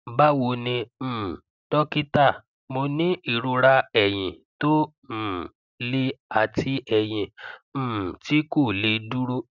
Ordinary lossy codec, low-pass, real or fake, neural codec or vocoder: none; 7.2 kHz; real; none